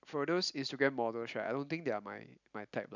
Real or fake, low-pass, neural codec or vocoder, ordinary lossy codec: real; 7.2 kHz; none; none